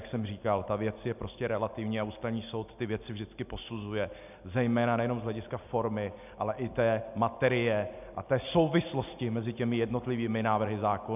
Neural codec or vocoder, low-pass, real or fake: none; 3.6 kHz; real